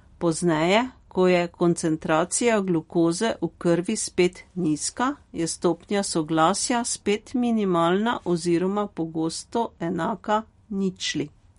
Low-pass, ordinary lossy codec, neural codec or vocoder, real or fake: 10.8 kHz; MP3, 48 kbps; none; real